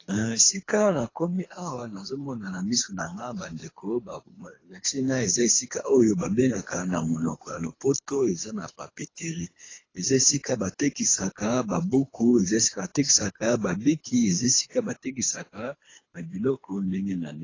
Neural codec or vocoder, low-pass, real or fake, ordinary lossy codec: codec, 24 kHz, 3 kbps, HILCodec; 7.2 kHz; fake; AAC, 32 kbps